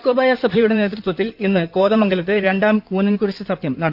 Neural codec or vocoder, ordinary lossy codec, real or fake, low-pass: codec, 16 kHz in and 24 kHz out, 2.2 kbps, FireRedTTS-2 codec; none; fake; 5.4 kHz